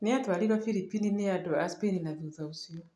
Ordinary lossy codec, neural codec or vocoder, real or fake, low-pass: none; none; real; none